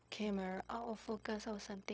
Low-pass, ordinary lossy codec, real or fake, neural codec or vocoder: none; none; fake; codec, 16 kHz, 0.4 kbps, LongCat-Audio-Codec